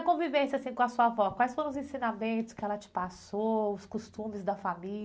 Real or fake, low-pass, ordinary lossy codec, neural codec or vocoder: real; none; none; none